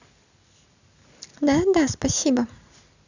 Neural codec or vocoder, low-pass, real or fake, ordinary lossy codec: none; 7.2 kHz; real; none